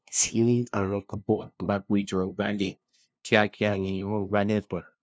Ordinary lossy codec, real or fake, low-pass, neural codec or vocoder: none; fake; none; codec, 16 kHz, 0.5 kbps, FunCodec, trained on LibriTTS, 25 frames a second